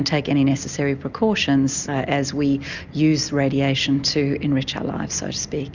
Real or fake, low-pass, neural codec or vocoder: real; 7.2 kHz; none